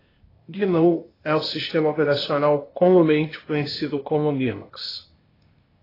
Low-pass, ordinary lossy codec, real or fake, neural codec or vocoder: 5.4 kHz; AAC, 24 kbps; fake; codec, 16 kHz, 0.8 kbps, ZipCodec